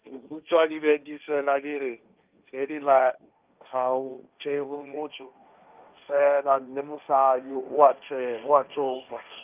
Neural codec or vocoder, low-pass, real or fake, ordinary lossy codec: codec, 16 kHz, 1.1 kbps, Voila-Tokenizer; 3.6 kHz; fake; Opus, 24 kbps